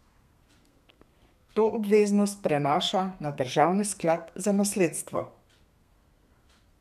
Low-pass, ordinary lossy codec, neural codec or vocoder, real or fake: 14.4 kHz; none; codec, 32 kHz, 1.9 kbps, SNAC; fake